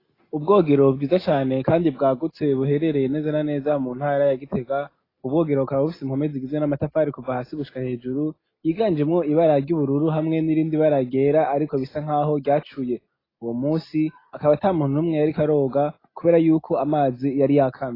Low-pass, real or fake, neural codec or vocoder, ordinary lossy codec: 5.4 kHz; real; none; AAC, 24 kbps